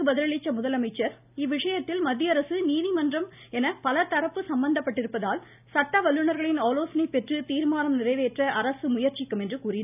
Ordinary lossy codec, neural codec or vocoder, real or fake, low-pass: none; none; real; 3.6 kHz